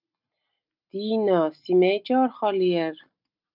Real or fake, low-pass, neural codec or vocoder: real; 5.4 kHz; none